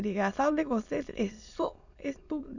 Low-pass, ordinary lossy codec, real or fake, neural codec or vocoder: 7.2 kHz; none; fake; autoencoder, 22.05 kHz, a latent of 192 numbers a frame, VITS, trained on many speakers